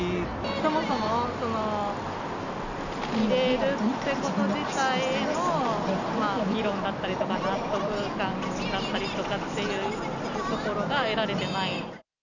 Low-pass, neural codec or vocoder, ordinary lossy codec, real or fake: 7.2 kHz; none; none; real